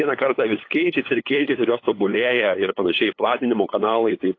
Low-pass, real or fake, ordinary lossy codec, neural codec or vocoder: 7.2 kHz; fake; AAC, 32 kbps; codec, 16 kHz, 8 kbps, FunCodec, trained on LibriTTS, 25 frames a second